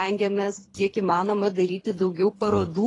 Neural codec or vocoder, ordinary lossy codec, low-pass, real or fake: codec, 24 kHz, 3 kbps, HILCodec; AAC, 32 kbps; 10.8 kHz; fake